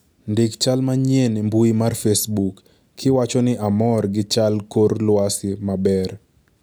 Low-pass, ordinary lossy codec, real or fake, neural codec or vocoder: none; none; real; none